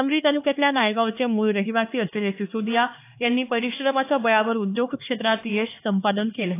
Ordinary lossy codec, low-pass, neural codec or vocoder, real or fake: AAC, 24 kbps; 3.6 kHz; codec, 16 kHz, 2 kbps, X-Codec, HuBERT features, trained on LibriSpeech; fake